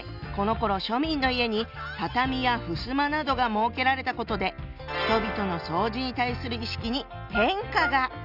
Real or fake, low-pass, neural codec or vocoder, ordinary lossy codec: real; 5.4 kHz; none; none